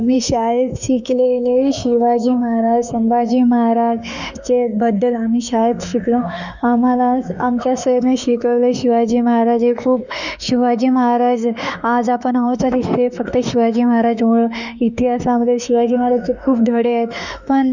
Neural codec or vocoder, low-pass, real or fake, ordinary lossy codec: autoencoder, 48 kHz, 32 numbers a frame, DAC-VAE, trained on Japanese speech; 7.2 kHz; fake; none